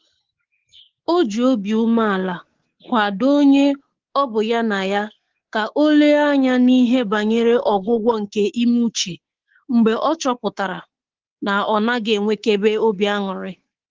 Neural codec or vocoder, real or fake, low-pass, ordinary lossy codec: codec, 24 kHz, 3.1 kbps, DualCodec; fake; 7.2 kHz; Opus, 16 kbps